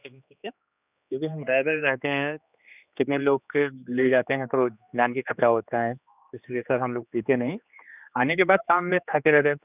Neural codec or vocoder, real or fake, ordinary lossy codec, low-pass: codec, 16 kHz, 2 kbps, X-Codec, HuBERT features, trained on general audio; fake; none; 3.6 kHz